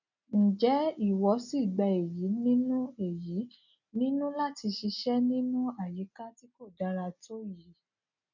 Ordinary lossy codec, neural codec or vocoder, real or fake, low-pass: none; none; real; 7.2 kHz